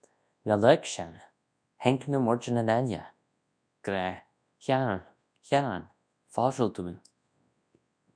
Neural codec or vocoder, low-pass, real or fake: codec, 24 kHz, 0.9 kbps, WavTokenizer, large speech release; 9.9 kHz; fake